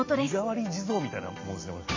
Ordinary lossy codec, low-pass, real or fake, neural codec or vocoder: MP3, 48 kbps; 7.2 kHz; real; none